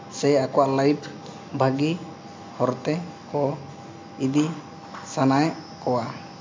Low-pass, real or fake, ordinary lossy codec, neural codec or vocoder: 7.2 kHz; real; MP3, 48 kbps; none